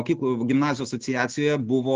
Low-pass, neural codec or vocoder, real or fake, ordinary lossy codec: 7.2 kHz; none; real; Opus, 16 kbps